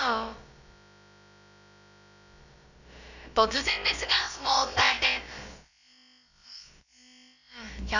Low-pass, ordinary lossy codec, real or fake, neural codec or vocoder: 7.2 kHz; none; fake; codec, 16 kHz, about 1 kbps, DyCAST, with the encoder's durations